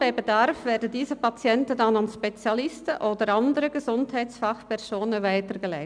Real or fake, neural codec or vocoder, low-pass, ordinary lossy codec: real; none; 9.9 kHz; none